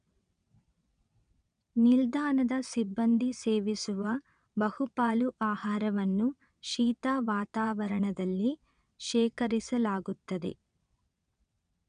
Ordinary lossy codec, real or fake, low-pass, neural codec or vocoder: none; fake; 9.9 kHz; vocoder, 22.05 kHz, 80 mel bands, WaveNeXt